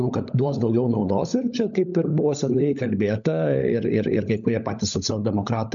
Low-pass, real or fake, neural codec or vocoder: 7.2 kHz; fake; codec, 16 kHz, 16 kbps, FunCodec, trained on LibriTTS, 50 frames a second